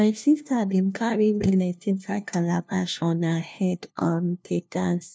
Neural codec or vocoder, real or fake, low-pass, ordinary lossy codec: codec, 16 kHz, 1 kbps, FunCodec, trained on LibriTTS, 50 frames a second; fake; none; none